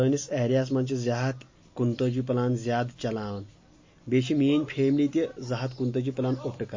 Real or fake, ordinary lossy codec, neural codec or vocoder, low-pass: real; MP3, 32 kbps; none; 7.2 kHz